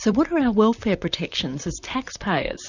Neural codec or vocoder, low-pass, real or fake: vocoder, 44.1 kHz, 128 mel bands, Pupu-Vocoder; 7.2 kHz; fake